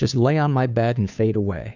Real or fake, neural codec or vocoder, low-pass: fake; codec, 16 kHz, 2 kbps, FunCodec, trained on Chinese and English, 25 frames a second; 7.2 kHz